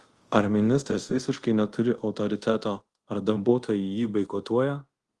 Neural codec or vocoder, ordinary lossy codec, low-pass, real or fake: codec, 24 kHz, 0.5 kbps, DualCodec; Opus, 24 kbps; 10.8 kHz; fake